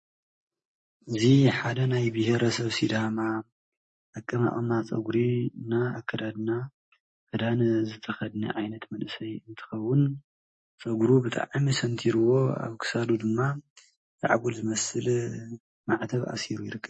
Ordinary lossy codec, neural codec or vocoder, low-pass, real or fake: MP3, 32 kbps; none; 9.9 kHz; real